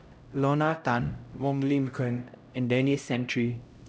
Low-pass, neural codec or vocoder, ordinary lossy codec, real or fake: none; codec, 16 kHz, 0.5 kbps, X-Codec, HuBERT features, trained on LibriSpeech; none; fake